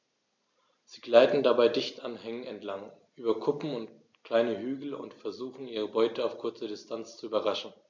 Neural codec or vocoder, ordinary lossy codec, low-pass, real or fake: none; MP3, 64 kbps; 7.2 kHz; real